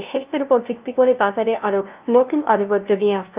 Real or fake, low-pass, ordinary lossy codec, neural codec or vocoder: fake; 3.6 kHz; Opus, 24 kbps; codec, 16 kHz, 0.5 kbps, FunCodec, trained on LibriTTS, 25 frames a second